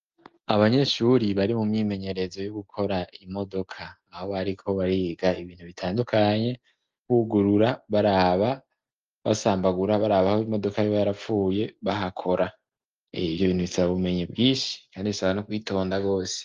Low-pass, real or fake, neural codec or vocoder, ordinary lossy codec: 7.2 kHz; real; none; Opus, 32 kbps